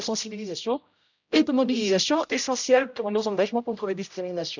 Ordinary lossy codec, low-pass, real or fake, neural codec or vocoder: none; 7.2 kHz; fake; codec, 16 kHz, 0.5 kbps, X-Codec, HuBERT features, trained on general audio